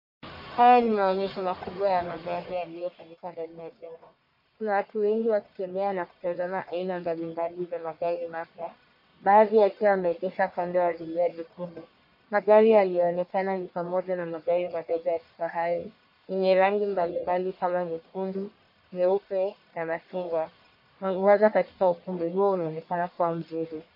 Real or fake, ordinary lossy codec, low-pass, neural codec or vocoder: fake; MP3, 32 kbps; 5.4 kHz; codec, 44.1 kHz, 1.7 kbps, Pupu-Codec